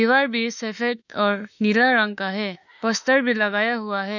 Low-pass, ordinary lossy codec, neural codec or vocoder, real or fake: 7.2 kHz; none; autoencoder, 48 kHz, 32 numbers a frame, DAC-VAE, trained on Japanese speech; fake